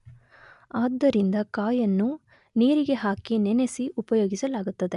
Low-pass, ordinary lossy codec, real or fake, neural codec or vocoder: 10.8 kHz; none; real; none